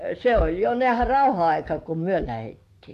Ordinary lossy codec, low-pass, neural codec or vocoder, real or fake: MP3, 64 kbps; 14.4 kHz; codec, 44.1 kHz, 7.8 kbps, DAC; fake